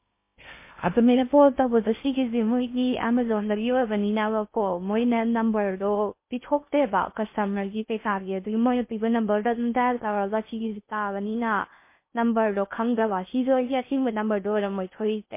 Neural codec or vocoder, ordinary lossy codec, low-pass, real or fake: codec, 16 kHz in and 24 kHz out, 0.6 kbps, FocalCodec, streaming, 2048 codes; MP3, 24 kbps; 3.6 kHz; fake